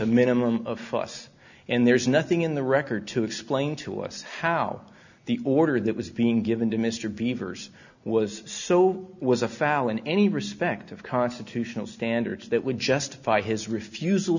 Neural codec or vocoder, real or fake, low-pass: none; real; 7.2 kHz